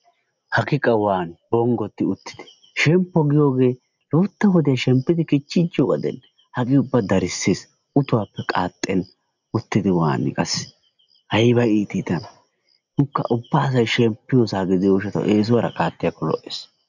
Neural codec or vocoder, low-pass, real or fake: none; 7.2 kHz; real